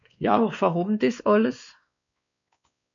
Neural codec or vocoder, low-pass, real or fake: codec, 16 kHz, 2 kbps, X-Codec, WavLM features, trained on Multilingual LibriSpeech; 7.2 kHz; fake